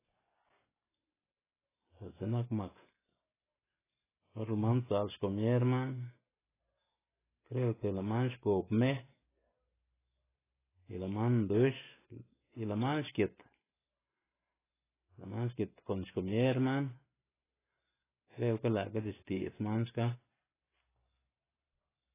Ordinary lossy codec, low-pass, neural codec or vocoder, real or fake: AAC, 16 kbps; 3.6 kHz; none; real